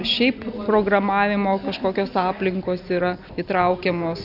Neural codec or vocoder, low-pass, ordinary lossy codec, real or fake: none; 5.4 kHz; AAC, 48 kbps; real